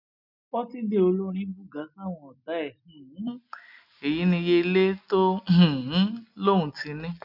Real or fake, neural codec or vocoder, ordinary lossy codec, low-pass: real; none; none; 5.4 kHz